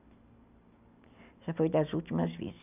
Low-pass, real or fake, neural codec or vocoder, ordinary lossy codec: 3.6 kHz; real; none; none